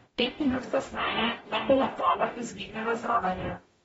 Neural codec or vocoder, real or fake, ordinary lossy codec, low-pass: codec, 44.1 kHz, 0.9 kbps, DAC; fake; AAC, 24 kbps; 19.8 kHz